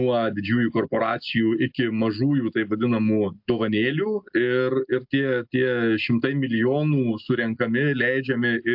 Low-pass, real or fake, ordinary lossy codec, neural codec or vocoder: 5.4 kHz; real; MP3, 48 kbps; none